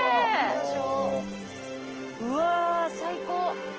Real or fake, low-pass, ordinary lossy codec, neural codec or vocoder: real; 7.2 kHz; Opus, 16 kbps; none